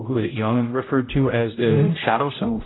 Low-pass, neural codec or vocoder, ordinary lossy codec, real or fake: 7.2 kHz; codec, 16 kHz, 0.5 kbps, X-Codec, HuBERT features, trained on general audio; AAC, 16 kbps; fake